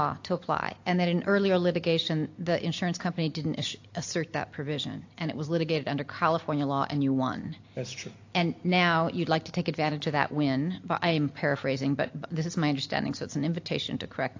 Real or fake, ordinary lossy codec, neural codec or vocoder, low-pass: real; AAC, 48 kbps; none; 7.2 kHz